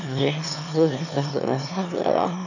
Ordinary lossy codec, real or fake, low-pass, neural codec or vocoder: none; fake; 7.2 kHz; autoencoder, 22.05 kHz, a latent of 192 numbers a frame, VITS, trained on one speaker